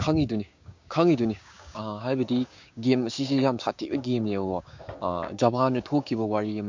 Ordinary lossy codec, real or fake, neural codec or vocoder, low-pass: MP3, 48 kbps; fake; codec, 16 kHz, 6 kbps, DAC; 7.2 kHz